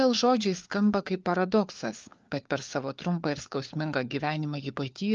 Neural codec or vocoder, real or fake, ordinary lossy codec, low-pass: codec, 16 kHz, 4 kbps, FunCodec, trained on LibriTTS, 50 frames a second; fake; Opus, 24 kbps; 7.2 kHz